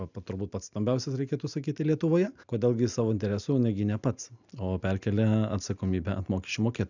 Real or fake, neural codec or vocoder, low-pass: real; none; 7.2 kHz